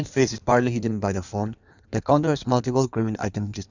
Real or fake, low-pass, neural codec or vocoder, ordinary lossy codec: fake; 7.2 kHz; codec, 16 kHz in and 24 kHz out, 1.1 kbps, FireRedTTS-2 codec; none